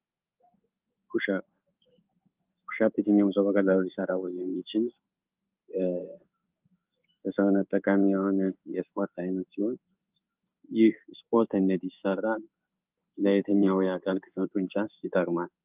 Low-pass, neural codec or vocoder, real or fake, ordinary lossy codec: 3.6 kHz; codec, 16 kHz in and 24 kHz out, 1 kbps, XY-Tokenizer; fake; Opus, 24 kbps